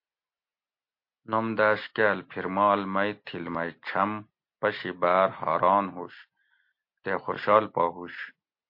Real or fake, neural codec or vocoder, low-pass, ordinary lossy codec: real; none; 5.4 kHz; MP3, 32 kbps